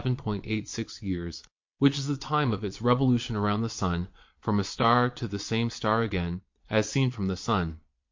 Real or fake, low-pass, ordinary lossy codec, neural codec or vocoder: fake; 7.2 kHz; MP3, 48 kbps; vocoder, 22.05 kHz, 80 mel bands, Vocos